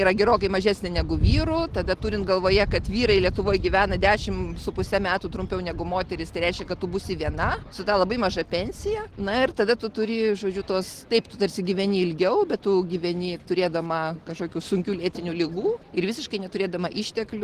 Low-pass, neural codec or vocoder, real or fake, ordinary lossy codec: 14.4 kHz; none; real; Opus, 16 kbps